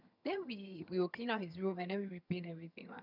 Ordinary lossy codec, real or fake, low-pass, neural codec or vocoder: none; fake; 5.4 kHz; vocoder, 22.05 kHz, 80 mel bands, HiFi-GAN